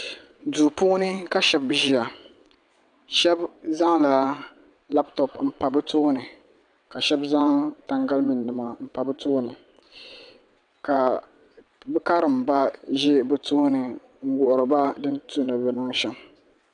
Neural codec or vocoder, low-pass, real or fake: vocoder, 22.05 kHz, 80 mel bands, WaveNeXt; 9.9 kHz; fake